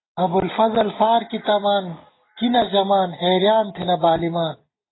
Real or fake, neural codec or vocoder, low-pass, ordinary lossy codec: real; none; 7.2 kHz; AAC, 16 kbps